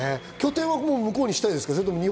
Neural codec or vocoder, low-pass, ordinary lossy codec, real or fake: none; none; none; real